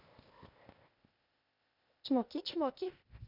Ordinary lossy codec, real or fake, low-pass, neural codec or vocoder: none; fake; 5.4 kHz; codec, 16 kHz, 0.8 kbps, ZipCodec